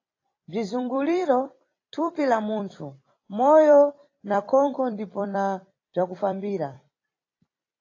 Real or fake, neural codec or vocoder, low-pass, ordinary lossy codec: fake; vocoder, 44.1 kHz, 128 mel bands every 256 samples, BigVGAN v2; 7.2 kHz; AAC, 32 kbps